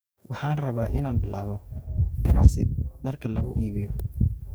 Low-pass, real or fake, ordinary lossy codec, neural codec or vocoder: none; fake; none; codec, 44.1 kHz, 2.6 kbps, DAC